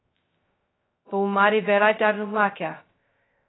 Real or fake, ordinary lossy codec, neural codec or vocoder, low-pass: fake; AAC, 16 kbps; codec, 16 kHz, 0.2 kbps, FocalCodec; 7.2 kHz